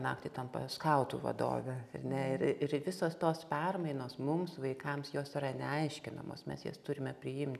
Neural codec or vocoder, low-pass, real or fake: none; 14.4 kHz; real